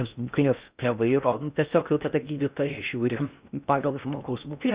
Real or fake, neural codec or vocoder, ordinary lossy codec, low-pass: fake; codec, 16 kHz in and 24 kHz out, 0.6 kbps, FocalCodec, streaming, 4096 codes; Opus, 64 kbps; 3.6 kHz